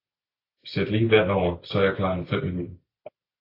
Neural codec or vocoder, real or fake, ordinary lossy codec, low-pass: none; real; AAC, 32 kbps; 5.4 kHz